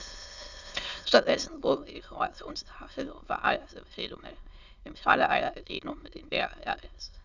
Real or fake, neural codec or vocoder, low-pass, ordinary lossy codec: fake; autoencoder, 22.05 kHz, a latent of 192 numbers a frame, VITS, trained on many speakers; 7.2 kHz; Opus, 64 kbps